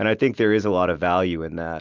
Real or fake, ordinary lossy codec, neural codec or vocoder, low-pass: real; Opus, 24 kbps; none; 7.2 kHz